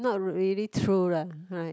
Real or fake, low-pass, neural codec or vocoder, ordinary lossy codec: real; none; none; none